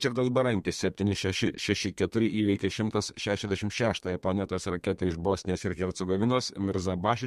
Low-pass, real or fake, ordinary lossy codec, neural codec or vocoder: 14.4 kHz; fake; MP3, 64 kbps; codec, 32 kHz, 1.9 kbps, SNAC